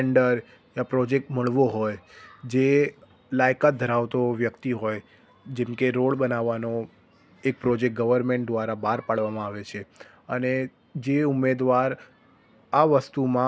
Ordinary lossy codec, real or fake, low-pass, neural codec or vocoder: none; real; none; none